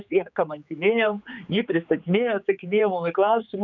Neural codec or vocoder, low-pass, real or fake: codec, 16 kHz, 4 kbps, X-Codec, HuBERT features, trained on general audio; 7.2 kHz; fake